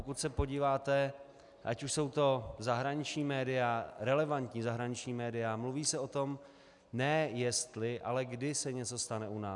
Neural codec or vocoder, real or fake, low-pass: none; real; 10.8 kHz